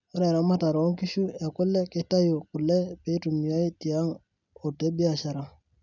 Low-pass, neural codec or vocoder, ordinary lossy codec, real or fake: 7.2 kHz; none; none; real